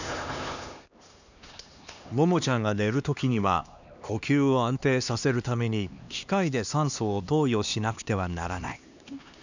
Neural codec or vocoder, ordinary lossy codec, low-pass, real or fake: codec, 16 kHz, 2 kbps, X-Codec, HuBERT features, trained on LibriSpeech; none; 7.2 kHz; fake